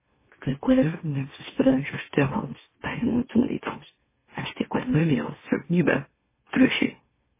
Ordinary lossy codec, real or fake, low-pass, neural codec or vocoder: MP3, 16 kbps; fake; 3.6 kHz; autoencoder, 44.1 kHz, a latent of 192 numbers a frame, MeloTTS